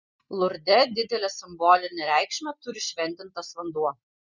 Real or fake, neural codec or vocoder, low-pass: real; none; 7.2 kHz